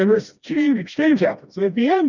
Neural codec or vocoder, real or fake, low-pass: codec, 16 kHz, 1 kbps, FreqCodec, smaller model; fake; 7.2 kHz